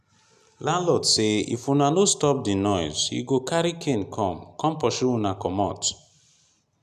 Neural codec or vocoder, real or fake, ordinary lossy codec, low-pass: none; real; none; 14.4 kHz